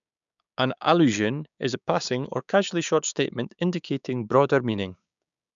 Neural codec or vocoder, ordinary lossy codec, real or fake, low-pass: codec, 16 kHz, 6 kbps, DAC; none; fake; 7.2 kHz